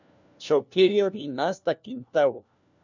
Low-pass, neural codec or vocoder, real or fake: 7.2 kHz; codec, 16 kHz, 1 kbps, FunCodec, trained on LibriTTS, 50 frames a second; fake